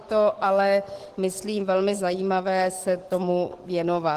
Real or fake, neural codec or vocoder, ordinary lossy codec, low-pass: fake; codec, 44.1 kHz, 7.8 kbps, DAC; Opus, 16 kbps; 14.4 kHz